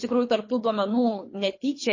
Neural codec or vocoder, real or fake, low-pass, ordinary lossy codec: codec, 24 kHz, 3 kbps, HILCodec; fake; 7.2 kHz; MP3, 32 kbps